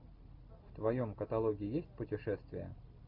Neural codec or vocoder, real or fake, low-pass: none; real; 5.4 kHz